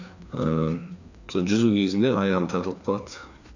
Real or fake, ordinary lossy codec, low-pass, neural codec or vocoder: fake; none; 7.2 kHz; codec, 16 kHz, 2 kbps, FreqCodec, larger model